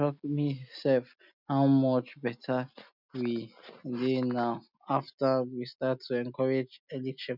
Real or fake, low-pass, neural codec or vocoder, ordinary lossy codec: real; 5.4 kHz; none; none